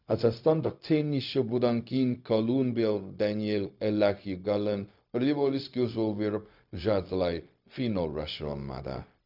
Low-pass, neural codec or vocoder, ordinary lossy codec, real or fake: 5.4 kHz; codec, 16 kHz, 0.4 kbps, LongCat-Audio-Codec; none; fake